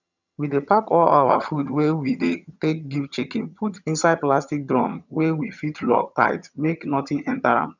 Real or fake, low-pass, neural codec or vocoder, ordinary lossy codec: fake; 7.2 kHz; vocoder, 22.05 kHz, 80 mel bands, HiFi-GAN; none